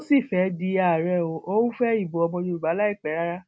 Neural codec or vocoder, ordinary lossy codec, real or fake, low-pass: none; none; real; none